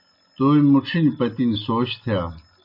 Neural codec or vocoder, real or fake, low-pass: none; real; 5.4 kHz